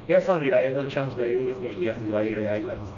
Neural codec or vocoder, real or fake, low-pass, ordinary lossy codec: codec, 16 kHz, 1 kbps, FreqCodec, smaller model; fake; 7.2 kHz; none